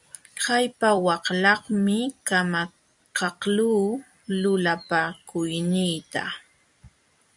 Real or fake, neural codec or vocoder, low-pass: fake; vocoder, 44.1 kHz, 128 mel bands every 256 samples, BigVGAN v2; 10.8 kHz